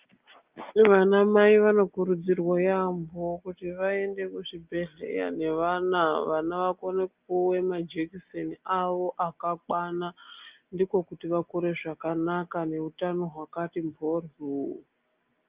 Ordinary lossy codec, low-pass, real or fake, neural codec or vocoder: Opus, 32 kbps; 3.6 kHz; real; none